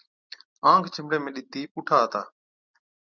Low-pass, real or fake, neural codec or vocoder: 7.2 kHz; real; none